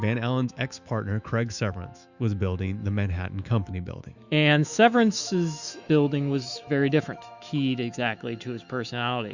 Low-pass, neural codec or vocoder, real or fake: 7.2 kHz; autoencoder, 48 kHz, 128 numbers a frame, DAC-VAE, trained on Japanese speech; fake